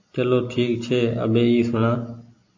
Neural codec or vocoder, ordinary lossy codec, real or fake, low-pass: none; AAC, 48 kbps; real; 7.2 kHz